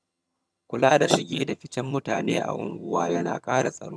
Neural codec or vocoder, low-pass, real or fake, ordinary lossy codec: vocoder, 22.05 kHz, 80 mel bands, HiFi-GAN; none; fake; none